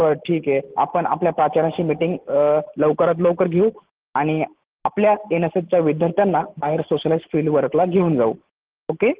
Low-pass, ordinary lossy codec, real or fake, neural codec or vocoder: 3.6 kHz; Opus, 16 kbps; real; none